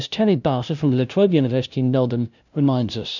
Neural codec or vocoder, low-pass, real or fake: codec, 16 kHz, 0.5 kbps, FunCodec, trained on LibriTTS, 25 frames a second; 7.2 kHz; fake